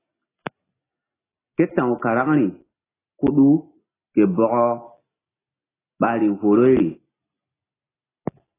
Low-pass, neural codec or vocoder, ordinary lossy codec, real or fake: 3.6 kHz; none; AAC, 16 kbps; real